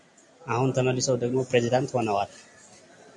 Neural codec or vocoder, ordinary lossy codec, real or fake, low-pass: none; AAC, 64 kbps; real; 10.8 kHz